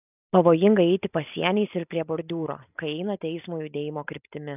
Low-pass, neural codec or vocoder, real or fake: 3.6 kHz; none; real